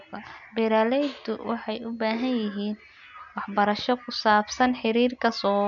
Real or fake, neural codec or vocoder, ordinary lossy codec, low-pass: real; none; none; 7.2 kHz